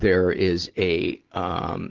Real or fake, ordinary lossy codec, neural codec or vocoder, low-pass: real; Opus, 16 kbps; none; 7.2 kHz